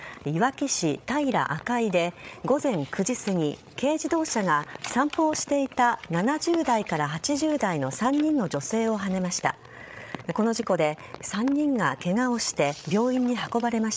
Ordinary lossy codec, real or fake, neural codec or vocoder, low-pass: none; fake; codec, 16 kHz, 8 kbps, FreqCodec, larger model; none